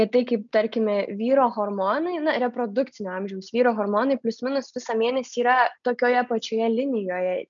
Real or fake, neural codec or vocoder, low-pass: real; none; 7.2 kHz